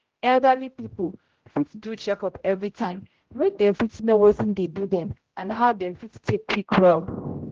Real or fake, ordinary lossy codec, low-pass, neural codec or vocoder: fake; Opus, 16 kbps; 7.2 kHz; codec, 16 kHz, 0.5 kbps, X-Codec, HuBERT features, trained on general audio